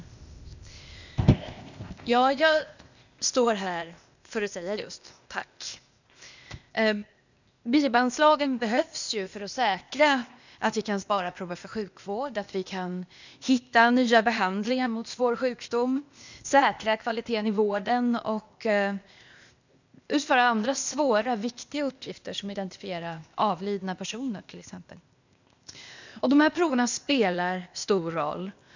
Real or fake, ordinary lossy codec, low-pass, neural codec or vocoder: fake; none; 7.2 kHz; codec, 16 kHz, 0.8 kbps, ZipCodec